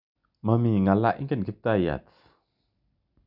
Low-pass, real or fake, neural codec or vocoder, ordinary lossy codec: 5.4 kHz; real; none; none